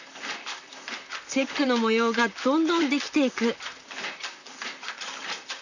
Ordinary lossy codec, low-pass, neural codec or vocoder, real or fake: none; 7.2 kHz; vocoder, 44.1 kHz, 128 mel bands, Pupu-Vocoder; fake